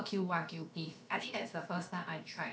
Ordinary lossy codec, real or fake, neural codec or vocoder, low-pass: none; fake; codec, 16 kHz, about 1 kbps, DyCAST, with the encoder's durations; none